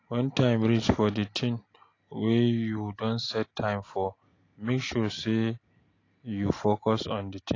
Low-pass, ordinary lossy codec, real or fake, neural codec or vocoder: 7.2 kHz; AAC, 32 kbps; real; none